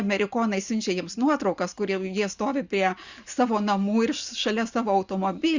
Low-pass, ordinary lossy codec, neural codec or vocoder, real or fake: 7.2 kHz; Opus, 64 kbps; none; real